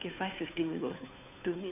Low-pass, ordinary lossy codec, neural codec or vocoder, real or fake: 3.6 kHz; none; codec, 16 kHz, 2 kbps, FunCodec, trained on Chinese and English, 25 frames a second; fake